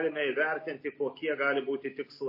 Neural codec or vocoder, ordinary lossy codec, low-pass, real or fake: none; MP3, 24 kbps; 5.4 kHz; real